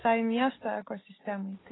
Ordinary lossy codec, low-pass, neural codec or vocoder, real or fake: AAC, 16 kbps; 7.2 kHz; none; real